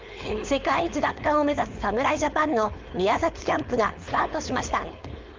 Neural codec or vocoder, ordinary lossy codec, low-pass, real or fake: codec, 16 kHz, 4.8 kbps, FACodec; Opus, 32 kbps; 7.2 kHz; fake